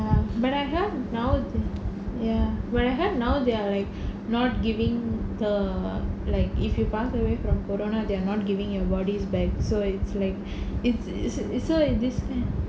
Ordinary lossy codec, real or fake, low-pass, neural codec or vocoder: none; real; none; none